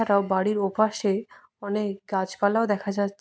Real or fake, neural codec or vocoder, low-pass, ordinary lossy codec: real; none; none; none